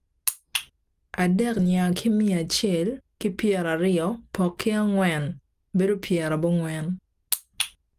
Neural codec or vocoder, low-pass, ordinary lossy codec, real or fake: none; 14.4 kHz; Opus, 32 kbps; real